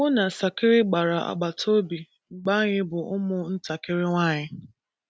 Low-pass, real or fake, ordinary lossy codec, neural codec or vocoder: none; real; none; none